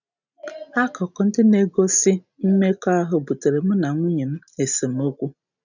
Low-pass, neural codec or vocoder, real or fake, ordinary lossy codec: 7.2 kHz; none; real; none